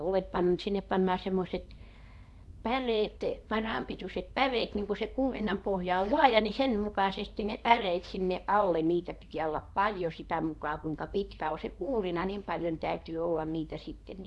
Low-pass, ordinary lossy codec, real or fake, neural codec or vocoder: none; none; fake; codec, 24 kHz, 0.9 kbps, WavTokenizer, small release